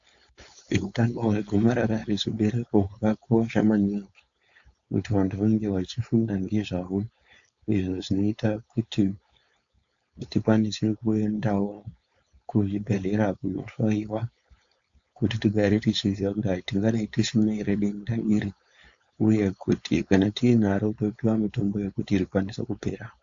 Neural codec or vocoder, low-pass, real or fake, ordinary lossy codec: codec, 16 kHz, 4.8 kbps, FACodec; 7.2 kHz; fake; AAC, 64 kbps